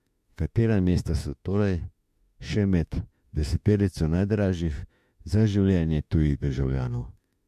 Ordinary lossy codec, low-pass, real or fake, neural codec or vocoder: MP3, 64 kbps; 14.4 kHz; fake; autoencoder, 48 kHz, 32 numbers a frame, DAC-VAE, trained on Japanese speech